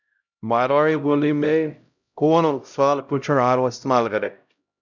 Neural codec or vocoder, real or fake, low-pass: codec, 16 kHz, 0.5 kbps, X-Codec, HuBERT features, trained on LibriSpeech; fake; 7.2 kHz